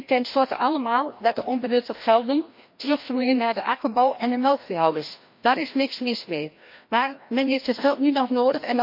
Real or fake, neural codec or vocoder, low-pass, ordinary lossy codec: fake; codec, 16 kHz, 1 kbps, FreqCodec, larger model; 5.4 kHz; MP3, 32 kbps